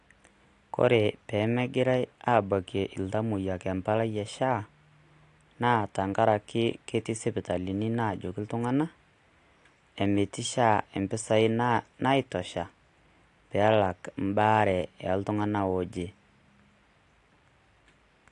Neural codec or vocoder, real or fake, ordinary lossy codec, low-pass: none; real; AAC, 48 kbps; 10.8 kHz